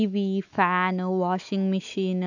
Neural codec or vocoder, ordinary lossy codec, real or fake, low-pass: autoencoder, 48 kHz, 128 numbers a frame, DAC-VAE, trained on Japanese speech; none; fake; 7.2 kHz